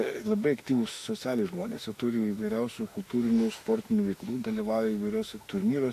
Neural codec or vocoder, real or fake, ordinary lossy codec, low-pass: autoencoder, 48 kHz, 32 numbers a frame, DAC-VAE, trained on Japanese speech; fake; AAC, 64 kbps; 14.4 kHz